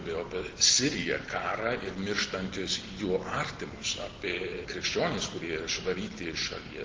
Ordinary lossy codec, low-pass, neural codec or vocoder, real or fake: Opus, 16 kbps; 7.2 kHz; none; real